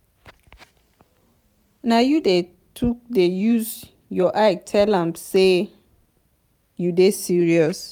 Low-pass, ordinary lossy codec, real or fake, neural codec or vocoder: 19.8 kHz; none; fake; vocoder, 44.1 kHz, 128 mel bands every 512 samples, BigVGAN v2